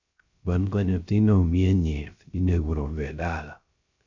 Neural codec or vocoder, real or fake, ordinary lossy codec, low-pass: codec, 16 kHz, 0.3 kbps, FocalCodec; fake; Opus, 64 kbps; 7.2 kHz